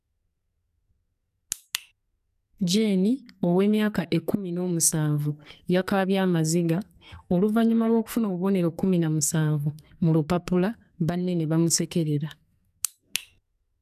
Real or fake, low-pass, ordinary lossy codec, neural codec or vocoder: fake; 14.4 kHz; none; codec, 44.1 kHz, 2.6 kbps, SNAC